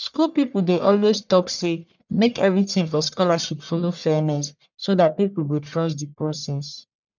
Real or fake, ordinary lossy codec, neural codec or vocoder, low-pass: fake; none; codec, 44.1 kHz, 1.7 kbps, Pupu-Codec; 7.2 kHz